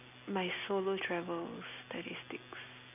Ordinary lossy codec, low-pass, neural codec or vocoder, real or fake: none; 3.6 kHz; none; real